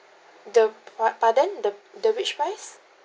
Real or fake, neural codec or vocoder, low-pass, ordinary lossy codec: real; none; none; none